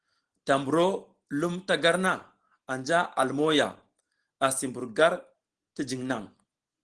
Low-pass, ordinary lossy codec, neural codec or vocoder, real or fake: 9.9 kHz; Opus, 16 kbps; none; real